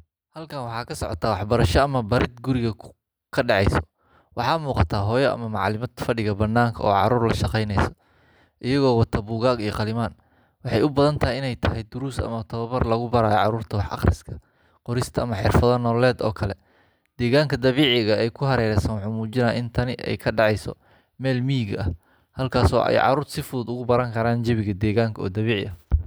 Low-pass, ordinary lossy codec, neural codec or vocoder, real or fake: none; none; none; real